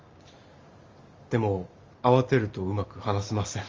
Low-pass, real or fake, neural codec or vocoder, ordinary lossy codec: 7.2 kHz; real; none; Opus, 32 kbps